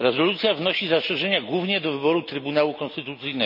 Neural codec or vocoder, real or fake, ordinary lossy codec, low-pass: none; real; none; 5.4 kHz